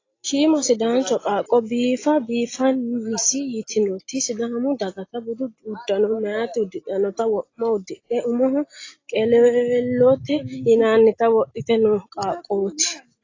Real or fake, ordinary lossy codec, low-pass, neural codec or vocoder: real; AAC, 32 kbps; 7.2 kHz; none